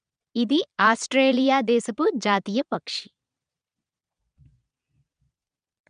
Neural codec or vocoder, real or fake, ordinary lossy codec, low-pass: vocoder, 22.05 kHz, 80 mel bands, Vocos; fake; none; 9.9 kHz